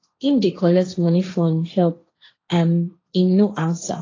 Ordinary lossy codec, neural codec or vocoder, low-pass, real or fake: AAC, 32 kbps; codec, 16 kHz, 1.1 kbps, Voila-Tokenizer; 7.2 kHz; fake